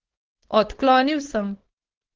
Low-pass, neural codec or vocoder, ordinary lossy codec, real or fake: 7.2 kHz; codec, 16 kHz, 4.8 kbps, FACodec; Opus, 16 kbps; fake